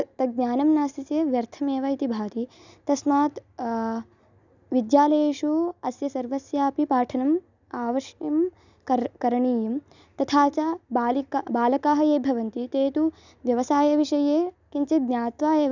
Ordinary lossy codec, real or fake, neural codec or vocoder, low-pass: none; real; none; 7.2 kHz